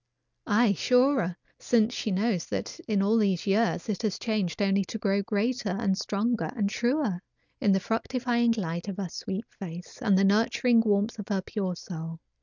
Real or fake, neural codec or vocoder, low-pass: real; none; 7.2 kHz